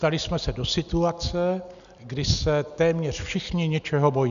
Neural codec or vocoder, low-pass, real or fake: none; 7.2 kHz; real